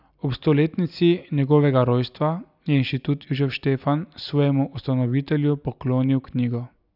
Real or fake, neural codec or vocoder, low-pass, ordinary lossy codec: real; none; 5.4 kHz; none